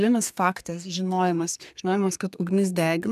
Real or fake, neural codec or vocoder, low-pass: fake; codec, 32 kHz, 1.9 kbps, SNAC; 14.4 kHz